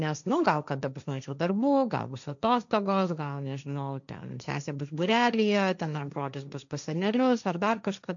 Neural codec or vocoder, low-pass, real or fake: codec, 16 kHz, 1.1 kbps, Voila-Tokenizer; 7.2 kHz; fake